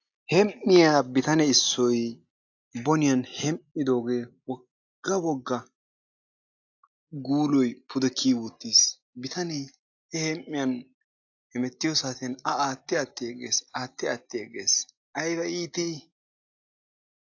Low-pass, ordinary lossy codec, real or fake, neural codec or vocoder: 7.2 kHz; AAC, 48 kbps; real; none